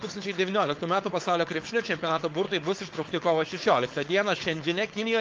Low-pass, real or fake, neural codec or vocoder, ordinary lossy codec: 7.2 kHz; fake; codec, 16 kHz, 4.8 kbps, FACodec; Opus, 24 kbps